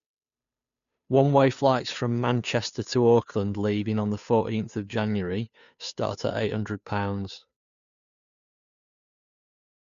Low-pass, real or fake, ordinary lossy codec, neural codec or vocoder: 7.2 kHz; fake; none; codec, 16 kHz, 2 kbps, FunCodec, trained on Chinese and English, 25 frames a second